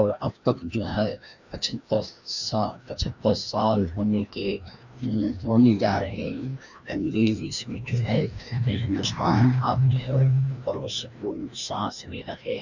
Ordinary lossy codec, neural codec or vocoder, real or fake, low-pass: none; codec, 16 kHz, 1 kbps, FreqCodec, larger model; fake; 7.2 kHz